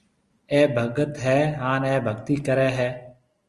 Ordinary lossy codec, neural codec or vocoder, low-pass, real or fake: Opus, 24 kbps; none; 10.8 kHz; real